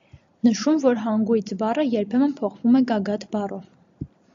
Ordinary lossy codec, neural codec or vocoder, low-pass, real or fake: AAC, 64 kbps; none; 7.2 kHz; real